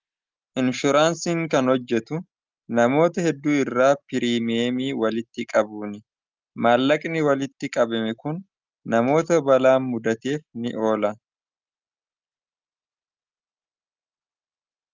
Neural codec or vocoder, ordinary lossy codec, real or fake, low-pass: none; Opus, 24 kbps; real; 7.2 kHz